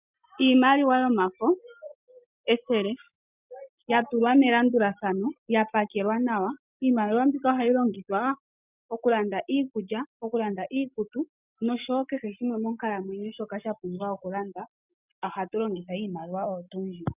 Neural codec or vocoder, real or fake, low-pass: none; real; 3.6 kHz